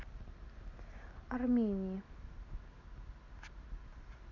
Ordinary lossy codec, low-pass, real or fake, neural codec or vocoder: none; 7.2 kHz; real; none